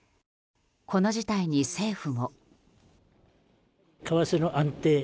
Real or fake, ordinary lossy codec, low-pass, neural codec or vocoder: real; none; none; none